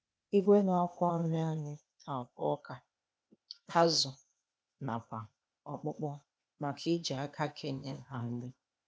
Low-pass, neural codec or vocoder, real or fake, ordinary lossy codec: none; codec, 16 kHz, 0.8 kbps, ZipCodec; fake; none